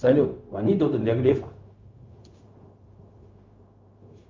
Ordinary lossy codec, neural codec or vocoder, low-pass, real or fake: Opus, 24 kbps; codec, 16 kHz, 0.4 kbps, LongCat-Audio-Codec; 7.2 kHz; fake